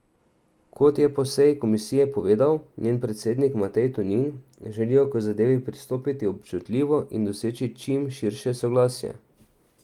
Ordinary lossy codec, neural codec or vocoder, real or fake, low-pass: Opus, 32 kbps; none; real; 19.8 kHz